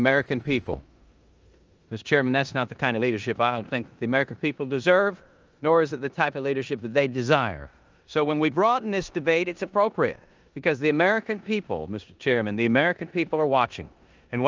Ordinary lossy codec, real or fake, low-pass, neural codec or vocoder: Opus, 24 kbps; fake; 7.2 kHz; codec, 16 kHz in and 24 kHz out, 0.9 kbps, LongCat-Audio-Codec, four codebook decoder